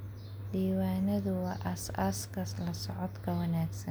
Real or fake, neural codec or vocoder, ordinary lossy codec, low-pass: real; none; none; none